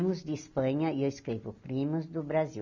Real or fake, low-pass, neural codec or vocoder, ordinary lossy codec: real; 7.2 kHz; none; none